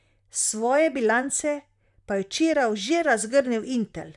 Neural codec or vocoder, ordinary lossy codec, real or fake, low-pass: vocoder, 44.1 kHz, 128 mel bands, Pupu-Vocoder; none; fake; 10.8 kHz